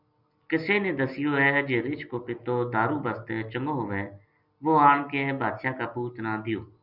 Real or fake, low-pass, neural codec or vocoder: real; 5.4 kHz; none